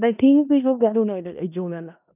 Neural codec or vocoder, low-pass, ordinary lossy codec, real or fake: codec, 16 kHz in and 24 kHz out, 0.4 kbps, LongCat-Audio-Codec, four codebook decoder; 3.6 kHz; none; fake